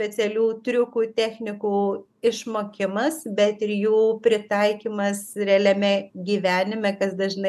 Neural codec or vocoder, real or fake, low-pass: none; real; 14.4 kHz